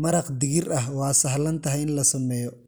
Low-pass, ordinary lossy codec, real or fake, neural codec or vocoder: none; none; real; none